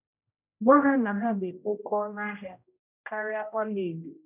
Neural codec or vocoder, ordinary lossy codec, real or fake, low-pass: codec, 16 kHz, 0.5 kbps, X-Codec, HuBERT features, trained on general audio; none; fake; 3.6 kHz